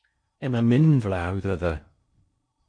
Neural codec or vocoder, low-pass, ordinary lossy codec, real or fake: codec, 16 kHz in and 24 kHz out, 0.8 kbps, FocalCodec, streaming, 65536 codes; 9.9 kHz; MP3, 48 kbps; fake